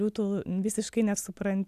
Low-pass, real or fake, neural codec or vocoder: 14.4 kHz; real; none